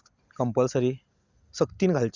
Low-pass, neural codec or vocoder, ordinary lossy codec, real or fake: 7.2 kHz; none; Opus, 64 kbps; real